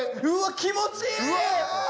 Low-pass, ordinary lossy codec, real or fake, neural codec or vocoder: none; none; real; none